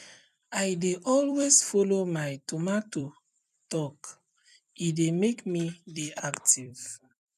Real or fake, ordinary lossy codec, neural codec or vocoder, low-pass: fake; none; vocoder, 44.1 kHz, 128 mel bands every 256 samples, BigVGAN v2; 14.4 kHz